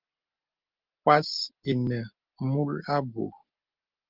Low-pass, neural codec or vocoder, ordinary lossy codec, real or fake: 5.4 kHz; none; Opus, 32 kbps; real